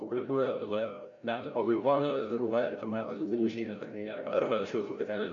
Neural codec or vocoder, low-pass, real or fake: codec, 16 kHz, 0.5 kbps, FreqCodec, larger model; 7.2 kHz; fake